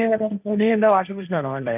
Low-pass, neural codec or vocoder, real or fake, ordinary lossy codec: 3.6 kHz; codec, 16 kHz, 1.1 kbps, Voila-Tokenizer; fake; none